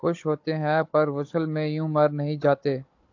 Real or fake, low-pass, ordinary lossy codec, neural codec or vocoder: fake; 7.2 kHz; AAC, 48 kbps; codec, 16 kHz, 16 kbps, FunCodec, trained on Chinese and English, 50 frames a second